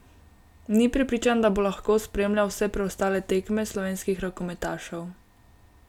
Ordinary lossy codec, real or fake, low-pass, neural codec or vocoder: none; real; 19.8 kHz; none